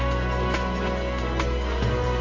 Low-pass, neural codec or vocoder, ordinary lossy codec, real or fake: 7.2 kHz; none; AAC, 32 kbps; real